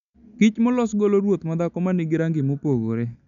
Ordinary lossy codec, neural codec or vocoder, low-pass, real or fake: none; none; 7.2 kHz; real